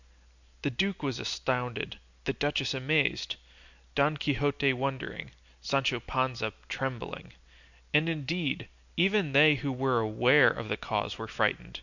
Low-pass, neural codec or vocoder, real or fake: 7.2 kHz; none; real